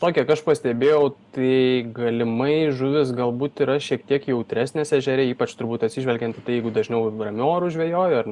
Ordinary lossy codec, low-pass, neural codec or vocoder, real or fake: Opus, 64 kbps; 10.8 kHz; none; real